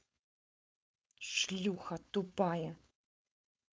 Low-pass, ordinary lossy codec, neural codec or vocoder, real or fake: none; none; codec, 16 kHz, 4.8 kbps, FACodec; fake